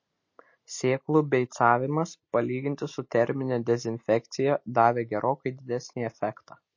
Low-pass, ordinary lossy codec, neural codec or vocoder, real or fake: 7.2 kHz; MP3, 32 kbps; none; real